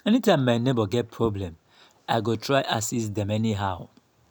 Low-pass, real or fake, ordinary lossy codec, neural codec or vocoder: none; real; none; none